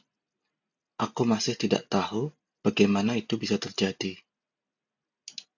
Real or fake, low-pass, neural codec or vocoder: real; 7.2 kHz; none